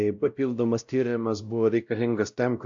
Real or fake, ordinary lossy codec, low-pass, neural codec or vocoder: fake; AAC, 64 kbps; 7.2 kHz; codec, 16 kHz, 0.5 kbps, X-Codec, WavLM features, trained on Multilingual LibriSpeech